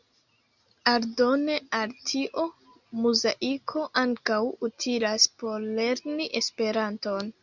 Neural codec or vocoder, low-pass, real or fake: none; 7.2 kHz; real